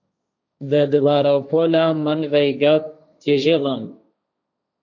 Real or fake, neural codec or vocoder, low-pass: fake; codec, 16 kHz, 1.1 kbps, Voila-Tokenizer; 7.2 kHz